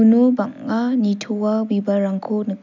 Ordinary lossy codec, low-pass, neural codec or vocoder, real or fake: none; 7.2 kHz; none; real